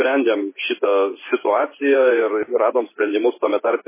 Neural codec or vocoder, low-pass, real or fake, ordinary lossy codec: vocoder, 24 kHz, 100 mel bands, Vocos; 3.6 kHz; fake; MP3, 16 kbps